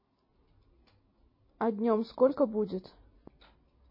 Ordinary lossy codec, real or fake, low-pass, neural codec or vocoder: MP3, 24 kbps; real; 5.4 kHz; none